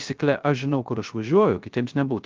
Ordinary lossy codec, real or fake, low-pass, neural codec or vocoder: Opus, 32 kbps; fake; 7.2 kHz; codec, 16 kHz, 0.3 kbps, FocalCodec